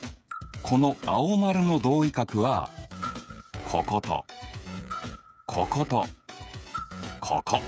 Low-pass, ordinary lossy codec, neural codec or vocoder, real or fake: none; none; codec, 16 kHz, 8 kbps, FreqCodec, smaller model; fake